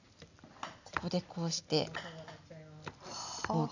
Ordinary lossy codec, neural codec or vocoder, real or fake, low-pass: none; none; real; 7.2 kHz